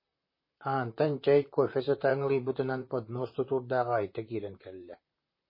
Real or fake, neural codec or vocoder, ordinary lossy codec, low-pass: fake; vocoder, 44.1 kHz, 128 mel bands, Pupu-Vocoder; MP3, 24 kbps; 5.4 kHz